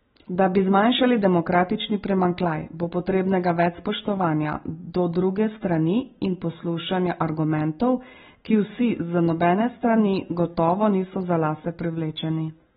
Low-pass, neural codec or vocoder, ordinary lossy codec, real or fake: 10.8 kHz; none; AAC, 16 kbps; real